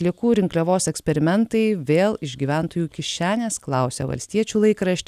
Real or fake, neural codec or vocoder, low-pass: real; none; 14.4 kHz